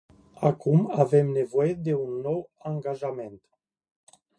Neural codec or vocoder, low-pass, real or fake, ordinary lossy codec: none; 9.9 kHz; real; MP3, 48 kbps